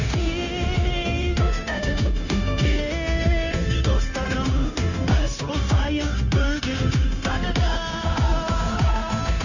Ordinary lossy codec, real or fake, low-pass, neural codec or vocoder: none; fake; 7.2 kHz; codec, 16 kHz, 0.9 kbps, LongCat-Audio-Codec